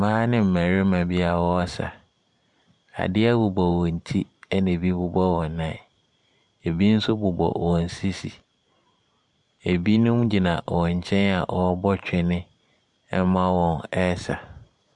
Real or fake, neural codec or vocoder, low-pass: fake; vocoder, 44.1 kHz, 128 mel bands every 512 samples, BigVGAN v2; 10.8 kHz